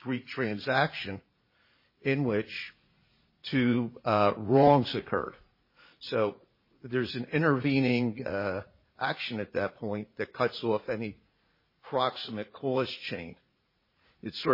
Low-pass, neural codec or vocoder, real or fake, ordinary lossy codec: 5.4 kHz; vocoder, 44.1 kHz, 80 mel bands, Vocos; fake; MP3, 24 kbps